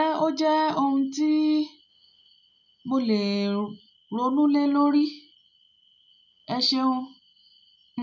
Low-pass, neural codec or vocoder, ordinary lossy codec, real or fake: 7.2 kHz; none; none; real